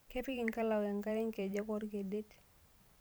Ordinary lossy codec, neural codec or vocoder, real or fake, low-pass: none; vocoder, 44.1 kHz, 128 mel bands every 512 samples, BigVGAN v2; fake; none